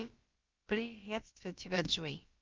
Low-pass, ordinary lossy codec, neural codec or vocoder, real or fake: 7.2 kHz; Opus, 32 kbps; codec, 16 kHz, about 1 kbps, DyCAST, with the encoder's durations; fake